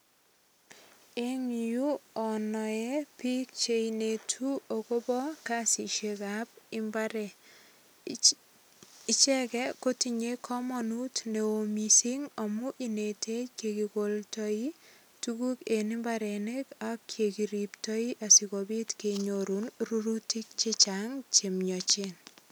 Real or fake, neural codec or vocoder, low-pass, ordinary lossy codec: real; none; none; none